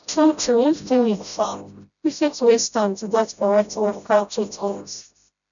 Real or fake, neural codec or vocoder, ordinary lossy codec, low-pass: fake; codec, 16 kHz, 0.5 kbps, FreqCodec, smaller model; none; 7.2 kHz